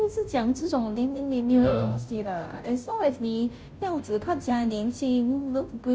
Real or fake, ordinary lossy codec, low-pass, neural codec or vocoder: fake; none; none; codec, 16 kHz, 0.5 kbps, FunCodec, trained on Chinese and English, 25 frames a second